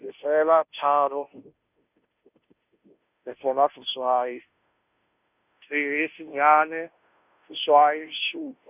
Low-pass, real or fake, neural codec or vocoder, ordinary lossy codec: 3.6 kHz; fake; codec, 16 kHz, 0.5 kbps, FunCodec, trained on Chinese and English, 25 frames a second; none